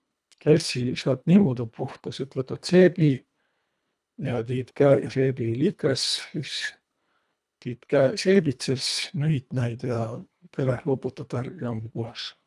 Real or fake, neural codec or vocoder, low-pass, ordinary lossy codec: fake; codec, 24 kHz, 1.5 kbps, HILCodec; none; none